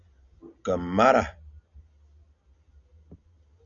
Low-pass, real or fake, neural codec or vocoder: 7.2 kHz; real; none